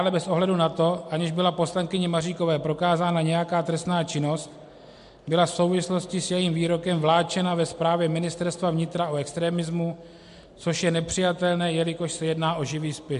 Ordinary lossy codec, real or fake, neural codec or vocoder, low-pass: MP3, 64 kbps; real; none; 10.8 kHz